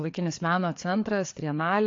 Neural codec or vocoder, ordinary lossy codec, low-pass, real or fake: codec, 16 kHz, 4 kbps, FreqCodec, larger model; AAC, 48 kbps; 7.2 kHz; fake